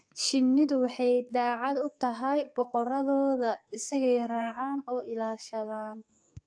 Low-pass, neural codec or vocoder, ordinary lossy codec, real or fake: 9.9 kHz; codec, 44.1 kHz, 2.6 kbps, SNAC; AAC, 64 kbps; fake